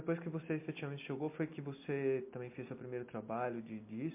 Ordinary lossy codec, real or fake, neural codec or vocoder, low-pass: MP3, 24 kbps; real; none; 3.6 kHz